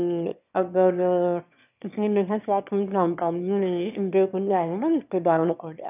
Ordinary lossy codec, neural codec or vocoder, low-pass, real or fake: none; autoencoder, 22.05 kHz, a latent of 192 numbers a frame, VITS, trained on one speaker; 3.6 kHz; fake